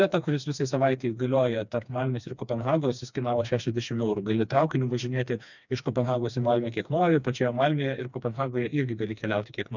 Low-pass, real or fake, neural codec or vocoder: 7.2 kHz; fake; codec, 16 kHz, 2 kbps, FreqCodec, smaller model